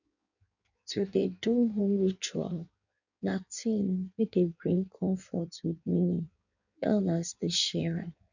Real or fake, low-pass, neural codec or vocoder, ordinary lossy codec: fake; 7.2 kHz; codec, 16 kHz in and 24 kHz out, 1.1 kbps, FireRedTTS-2 codec; none